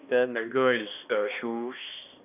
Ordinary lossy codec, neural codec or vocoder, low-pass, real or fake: none; codec, 16 kHz, 1 kbps, X-Codec, HuBERT features, trained on balanced general audio; 3.6 kHz; fake